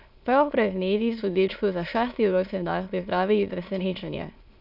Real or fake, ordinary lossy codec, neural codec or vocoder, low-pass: fake; none; autoencoder, 22.05 kHz, a latent of 192 numbers a frame, VITS, trained on many speakers; 5.4 kHz